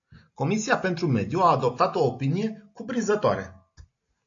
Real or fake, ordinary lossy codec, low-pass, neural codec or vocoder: real; AAC, 48 kbps; 7.2 kHz; none